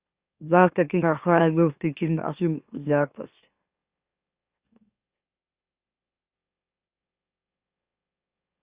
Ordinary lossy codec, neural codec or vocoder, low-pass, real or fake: Opus, 64 kbps; autoencoder, 44.1 kHz, a latent of 192 numbers a frame, MeloTTS; 3.6 kHz; fake